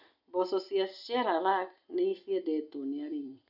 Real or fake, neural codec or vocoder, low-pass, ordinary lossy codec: real; none; 5.4 kHz; none